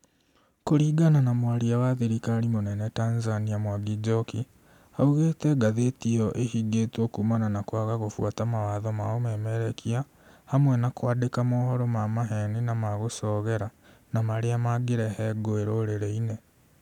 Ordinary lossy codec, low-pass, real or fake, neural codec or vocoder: none; 19.8 kHz; real; none